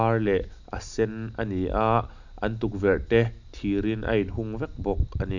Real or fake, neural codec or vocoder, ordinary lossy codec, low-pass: real; none; none; 7.2 kHz